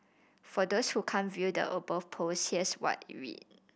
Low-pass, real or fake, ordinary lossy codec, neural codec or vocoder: none; real; none; none